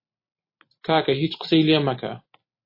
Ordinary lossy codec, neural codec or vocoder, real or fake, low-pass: MP3, 24 kbps; vocoder, 44.1 kHz, 128 mel bands every 256 samples, BigVGAN v2; fake; 5.4 kHz